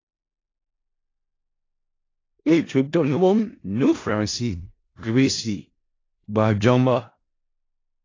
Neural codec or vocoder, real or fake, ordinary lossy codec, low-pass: codec, 16 kHz in and 24 kHz out, 0.4 kbps, LongCat-Audio-Codec, four codebook decoder; fake; AAC, 32 kbps; 7.2 kHz